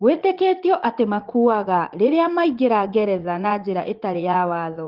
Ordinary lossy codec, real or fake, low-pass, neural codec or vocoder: Opus, 16 kbps; fake; 5.4 kHz; vocoder, 44.1 kHz, 80 mel bands, Vocos